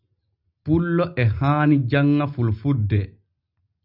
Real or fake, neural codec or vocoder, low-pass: real; none; 5.4 kHz